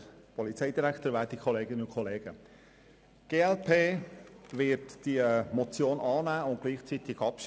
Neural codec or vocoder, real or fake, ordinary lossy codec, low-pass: none; real; none; none